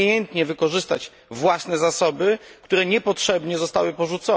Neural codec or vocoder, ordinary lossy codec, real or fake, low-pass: none; none; real; none